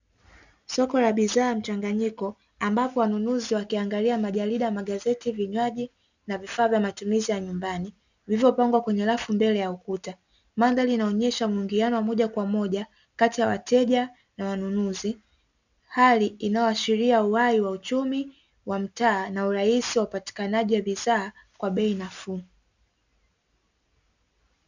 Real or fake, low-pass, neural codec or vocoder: real; 7.2 kHz; none